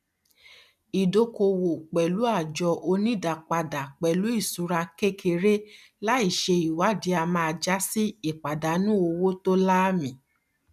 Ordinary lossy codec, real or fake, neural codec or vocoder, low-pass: none; real; none; 14.4 kHz